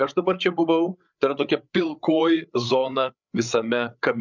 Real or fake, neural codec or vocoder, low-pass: fake; codec, 16 kHz, 8 kbps, FreqCodec, larger model; 7.2 kHz